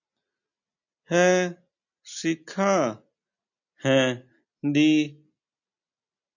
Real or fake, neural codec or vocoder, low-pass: real; none; 7.2 kHz